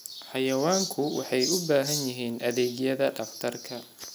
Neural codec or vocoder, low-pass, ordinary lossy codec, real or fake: none; none; none; real